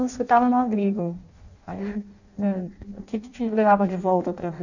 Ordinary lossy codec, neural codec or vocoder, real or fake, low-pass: none; codec, 16 kHz in and 24 kHz out, 0.6 kbps, FireRedTTS-2 codec; fake; 7.2 kHz